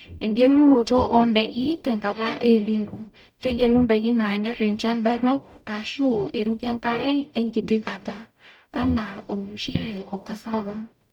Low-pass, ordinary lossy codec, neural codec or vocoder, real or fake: 19.8 kHz; none; codec, 44.1 kHz, 0.9 kbps, DAC; fake